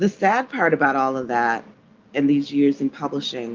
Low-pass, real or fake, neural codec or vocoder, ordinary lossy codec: 7.2 kHz; real; none; Opus, 24 kbps